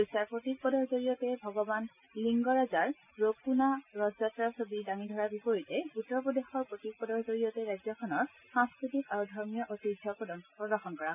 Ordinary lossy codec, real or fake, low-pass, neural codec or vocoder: none; real; 3.6 kHz; none